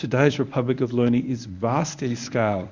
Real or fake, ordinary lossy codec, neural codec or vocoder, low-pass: fake; Opus, 64 kbps; codec, 24 kHz, 0.9 kbps, WavTokenizer, medium speech release version 1; 7.2 kHz